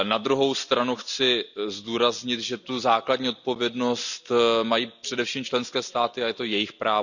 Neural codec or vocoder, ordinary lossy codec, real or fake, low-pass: none; none; real; 7.2 kHz